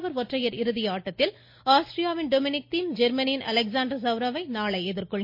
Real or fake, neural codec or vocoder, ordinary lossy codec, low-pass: real; none; MP3, 32 kbps; 5.4 kHz